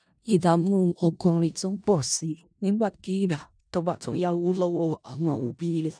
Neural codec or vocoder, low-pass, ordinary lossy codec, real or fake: codec, 16 kHz in and 24 kHz out, 0.4 kbps, LongCat-Audio-Codec, four codebook decoder; 9.9 kHz; none; fake